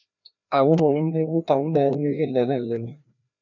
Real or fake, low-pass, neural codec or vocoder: fake; 7.2 kHz; codec, 16 kHz, 1 kbps, FreqCodec, larger model